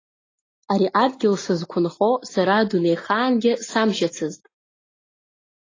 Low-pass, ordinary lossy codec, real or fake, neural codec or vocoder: 7.2 kHz; AAC, 32 kbps; real; none